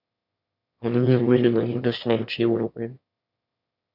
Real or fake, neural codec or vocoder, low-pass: fake; autoencoder, 22.05 kHz, a latent of 192 numbers a frame, VITS, trained on one speaker; 5.4 kHz